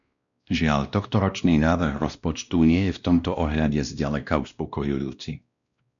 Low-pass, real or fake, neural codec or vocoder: 7.2 kHz; fake; codec, 16 kHz, 1 kbps, X-Codec, WavLM features, trained on Multilingual LibriSpeech